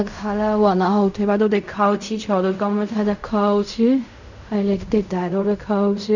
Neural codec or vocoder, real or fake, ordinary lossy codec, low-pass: codec, 16 kHz in and 24 kHz out, 0.4 kbps, LongCat-Audio-Codec, fine tuned four codebook decoder; fake; none; 7.2 kHz